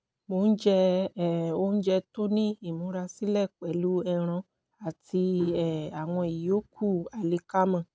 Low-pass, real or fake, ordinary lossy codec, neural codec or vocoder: none; real; none; none